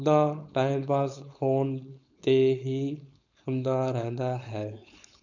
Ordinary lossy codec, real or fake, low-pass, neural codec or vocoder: none; fake; 7.2 kHz; codec, 16 kHz, 4.8 kbps, FACodec